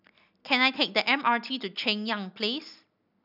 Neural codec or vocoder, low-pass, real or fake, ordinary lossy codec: none; 5.4 kHz; real; none